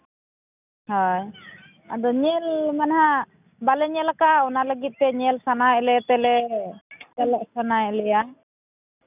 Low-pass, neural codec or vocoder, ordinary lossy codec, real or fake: 3.6 kHz; none; none; real